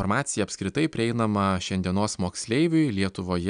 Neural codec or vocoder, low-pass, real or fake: none; 9.9 kHz; real